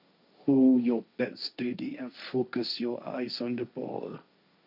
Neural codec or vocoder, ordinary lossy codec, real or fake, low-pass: codec, 16 kHz, 1.1 kbps, Voila-Tokenizer; none; fake; 5.4 kHz